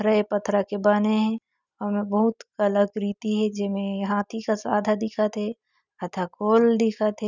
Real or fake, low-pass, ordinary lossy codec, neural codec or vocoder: real; 7.2 kHz; none; none